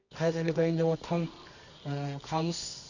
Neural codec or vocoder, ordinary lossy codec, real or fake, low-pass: codec, 24 kHz, 0.9 kbps, WavTokenizer, medium music audio release; none; fake; 7.2 kHz